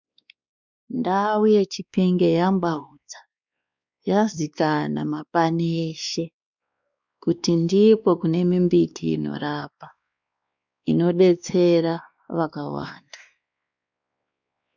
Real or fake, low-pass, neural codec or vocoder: fake; 7.2 kHz; codec, 16 kHz, 2 kbps, X-Codec, WavLM features, trained on Multilingual LibriSpeech